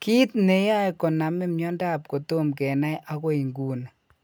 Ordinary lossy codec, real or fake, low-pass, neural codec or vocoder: none; real; none; none